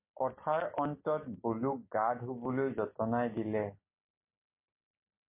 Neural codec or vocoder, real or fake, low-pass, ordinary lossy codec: none; real; 3.6 kHz; MP3, 24 kbps